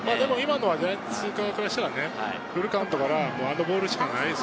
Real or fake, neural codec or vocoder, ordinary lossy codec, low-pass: real; none; none; none